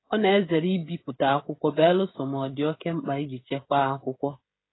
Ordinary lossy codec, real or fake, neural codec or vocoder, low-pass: AAC, 16 kbps; fake; codec, 16 kHz, 16 kbps, FreqCodec, smaller model; 7.2 kHz